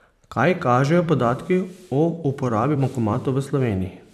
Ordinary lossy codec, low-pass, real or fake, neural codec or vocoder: none; 14.4 kHz; fake; vocoder, 44.1 kHz, 128 mel bands every 256 samples, BigVGAN v2